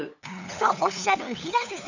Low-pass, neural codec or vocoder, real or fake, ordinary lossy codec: 7.2 kHz; codec, 16 kHz, 8 kbps, FunCodec, trained on LibriTTS, 25 frames a second; fake; none